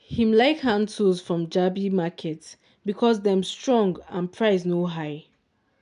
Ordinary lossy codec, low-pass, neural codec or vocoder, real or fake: none; 10.8 kHz; none; real